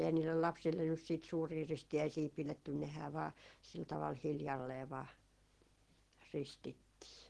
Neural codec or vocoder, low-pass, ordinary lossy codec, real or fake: none; 10.8 kHz; Opus, 16 kbps; real